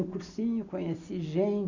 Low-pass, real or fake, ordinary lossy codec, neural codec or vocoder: 7.2 kHz; real; none; none